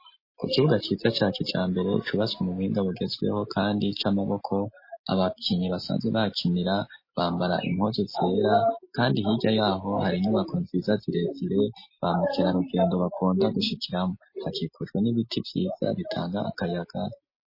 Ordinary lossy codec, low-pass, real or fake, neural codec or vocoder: MP3, 24 kbps; 5.4 kHz; real; none